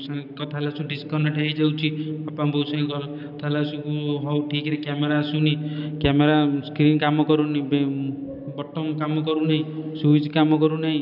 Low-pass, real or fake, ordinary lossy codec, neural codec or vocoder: 5.4 kHz; real; none; none